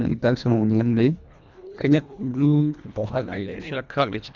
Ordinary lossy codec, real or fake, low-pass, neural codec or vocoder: Opus, 64 kbps; fake; 7.2 kHz; codec, 24 kHz, 1.5 kbps, HILCodec